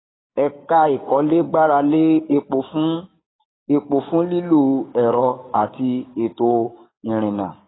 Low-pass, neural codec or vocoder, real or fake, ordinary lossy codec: 7.2 kHz; codec, 16 kHz, 6 kbps, DAC; fake; AAC, 16 kbps